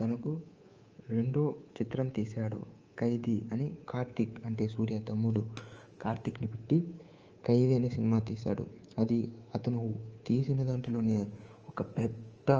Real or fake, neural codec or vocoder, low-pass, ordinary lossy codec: fake; codec, 24 kHz, 3.1 kbps, DualCodec; 7.2 kHz; Opus, 24 kbps